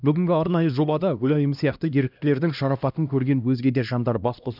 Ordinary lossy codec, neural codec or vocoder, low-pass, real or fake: none; codec, 16 kHz, 1 kbps, X-Codec, HuBERT features, trained on LibriSpeech; 5.4 kHz; fake